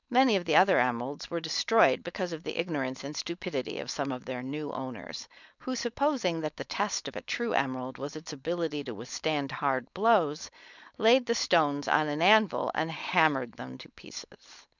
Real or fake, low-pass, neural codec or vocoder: fake; 7.2 kHz; codec, 16 kHz, 4.8 kbps, FACodec